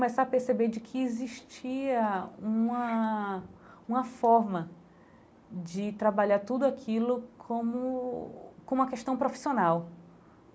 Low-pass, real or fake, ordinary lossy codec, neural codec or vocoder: none; real; none; none